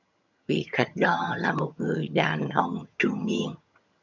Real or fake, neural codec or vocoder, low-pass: fake; vocoder, 22.05 kHz, 80 mel bands, HiFi-GAN; 7.2 kHz